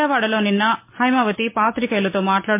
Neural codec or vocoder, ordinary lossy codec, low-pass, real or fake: none; MP3, 24 kbps; 3.6 kHz; real